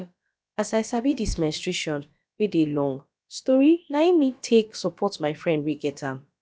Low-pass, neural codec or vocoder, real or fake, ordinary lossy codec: none; codec, 16 kHz, about 1 kbps, DyCAST, with the encoder's durations; fake; none